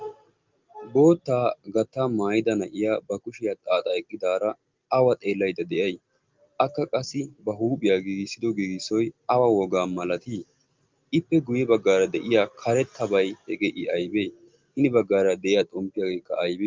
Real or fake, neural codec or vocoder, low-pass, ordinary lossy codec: real; none; 7.2 kHz; Opus, 32 kbps